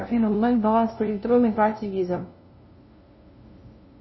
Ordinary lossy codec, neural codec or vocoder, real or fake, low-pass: MP3, 24 kbps; codec, 16 kHz, 0.5 kbps, FunCodec, trained on LibriTTS, 25 frames a second; fake; 7.2 kHz